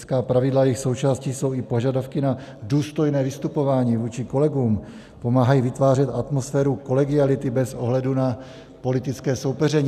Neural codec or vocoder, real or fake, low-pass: none; real; 14.4 kHz